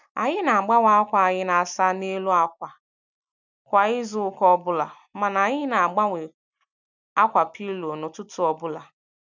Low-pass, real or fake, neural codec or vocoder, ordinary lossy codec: 7.2 kHz; real; none; none